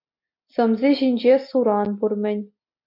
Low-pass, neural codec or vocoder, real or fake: 5.4 kHz; none; real